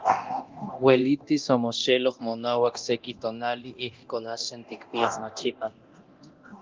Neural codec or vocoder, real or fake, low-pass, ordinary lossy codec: codec, 24 kHz, 0.9 kbps, DualCodec; fake; 7.2 kHz; Opus, 32 kbps